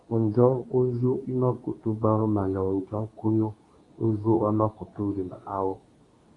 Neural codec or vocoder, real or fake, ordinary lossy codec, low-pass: codec, 24 kHz, 0.9 kbps, WavTokenizer, medium speech release version 2; fake; AAC, 32 kbps; 10.8 kHz